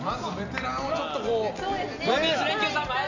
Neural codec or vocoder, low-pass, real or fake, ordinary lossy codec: none; 7.2 kHz; real; none